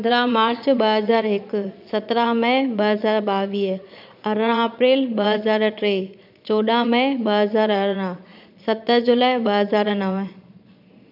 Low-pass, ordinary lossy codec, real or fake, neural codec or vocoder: 5.4 kHz; none; fake; vocoder, 22.05 kHz, 80 mel bands, Vocos